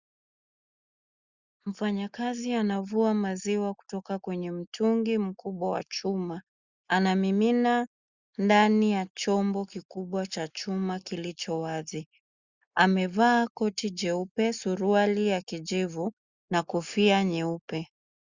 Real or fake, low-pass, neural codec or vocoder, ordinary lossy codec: real; 7.2 kHz; none; Opus, 64 kbps